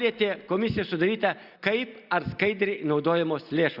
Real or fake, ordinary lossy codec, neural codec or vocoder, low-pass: real; Opus, 64 kbps; none; 5.4 kHz